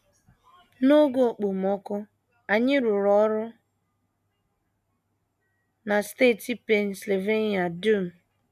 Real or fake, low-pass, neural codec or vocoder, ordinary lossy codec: real; 14.4 kHz; none; none